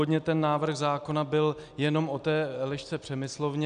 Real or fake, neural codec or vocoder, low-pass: real; none; 9.9 kHz